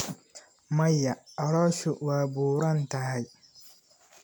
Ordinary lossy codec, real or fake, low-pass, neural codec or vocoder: none; real; none; none